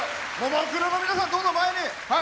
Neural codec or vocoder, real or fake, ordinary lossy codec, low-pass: none; real; none; none